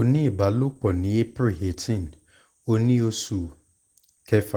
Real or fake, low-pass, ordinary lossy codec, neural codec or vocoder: real; 19.8 kHz; Opus, 16 kbps; none